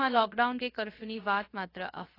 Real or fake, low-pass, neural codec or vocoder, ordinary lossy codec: fake; 5.4 kHz; codec, 16 kHz, 0.7 kbps, FocalCodec; AAC, 24 kbps